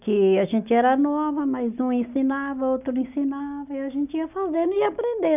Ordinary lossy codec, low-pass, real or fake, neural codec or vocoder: none; 3.6 kHz; real; none